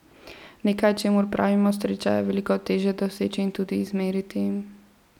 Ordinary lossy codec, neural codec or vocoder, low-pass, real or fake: none; none; 19.8 kHz; real